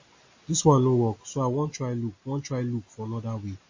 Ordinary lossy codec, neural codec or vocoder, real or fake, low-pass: MP3, 32 kbps; none; real; 7.2 kHz